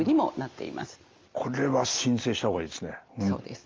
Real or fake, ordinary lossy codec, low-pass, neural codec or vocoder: real; Opus, 32 kbps; 7.2 kHz; none